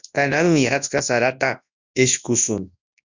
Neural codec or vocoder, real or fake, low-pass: codec, 24 kHz, 0.9 kbps, WavTokenizer, large speech release; fake; 7.2 kHz